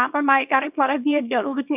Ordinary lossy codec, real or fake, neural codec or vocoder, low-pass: none; fake; codec, 24 kHz, 0.9 kbps, WavTokenizer, small release; 3.6 kHz